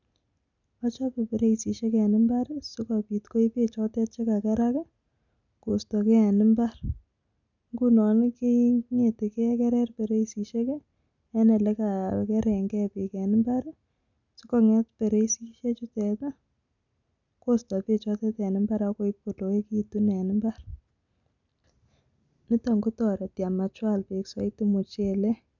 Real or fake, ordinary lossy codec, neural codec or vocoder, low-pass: real; none; none; 7.2 kHz